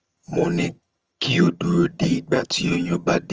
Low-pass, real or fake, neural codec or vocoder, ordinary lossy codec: 7.2 kHz; fake; vocoder, 22.05 kHz, 80 mel bands, HiFi-GAN; Opus, 24 kbps